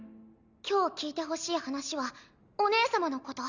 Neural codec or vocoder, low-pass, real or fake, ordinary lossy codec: none; 7.2 kHz; real; none